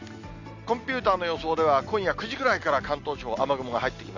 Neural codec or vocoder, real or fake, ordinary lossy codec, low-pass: none; real; none; 7.2 kHz